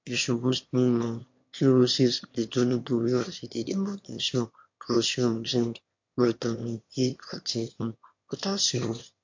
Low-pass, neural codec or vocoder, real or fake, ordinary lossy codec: 7.2 kHz; autoencoder, 22.05 kHz, a latent of 192 numbers a frame, VITS, trained on one speaker; fake; MP3, 48 kbps